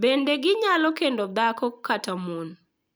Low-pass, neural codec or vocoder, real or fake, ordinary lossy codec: none; none; real; none